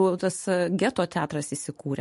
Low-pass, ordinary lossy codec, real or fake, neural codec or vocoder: 14.4 kHz; MP3, 48 kbps; real; none